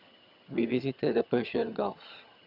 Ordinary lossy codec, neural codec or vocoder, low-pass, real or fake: none; vocoder, 22.05 kHz, 80 mel bands, HiFi-GAN; 5.4 kHz; fake